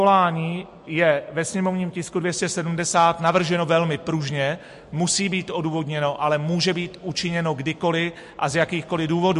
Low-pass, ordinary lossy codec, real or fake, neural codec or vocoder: 14.4 kHz; MP3, 48 kbps; real; none